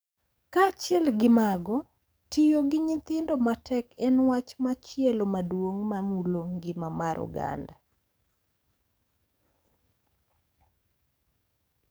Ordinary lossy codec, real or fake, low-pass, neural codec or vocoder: none; fake; none; codec, 44.1 kHz, 7.8 kbps, DAC